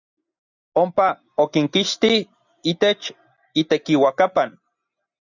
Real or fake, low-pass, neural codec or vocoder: real; 7.2 kHz; none